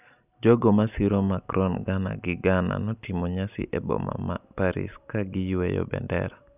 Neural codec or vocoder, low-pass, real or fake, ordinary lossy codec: none; 3.6 kHz; real; none